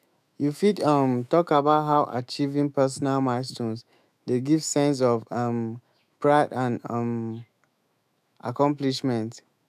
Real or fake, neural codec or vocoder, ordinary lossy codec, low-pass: fake; autoencoder, 48 kHz, 128 numbers a frame, DAC-VAE, trained on Japanese speech; none; 14.4 kHz